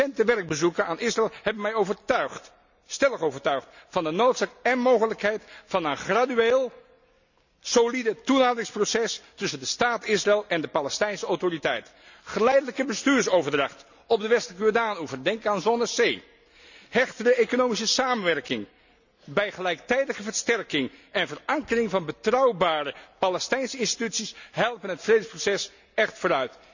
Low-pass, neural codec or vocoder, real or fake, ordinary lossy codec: 7.2 kHz; none; real; none